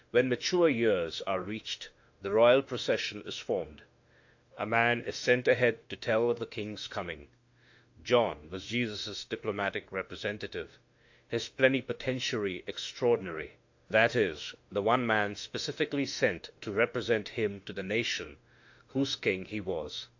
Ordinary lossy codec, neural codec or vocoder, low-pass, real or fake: AAC, 48 kbps; autoencoder, 48 kHz, 32 numbers a frame, DAC-VAE, trained on Japanese speech; 7.2 kHz; fake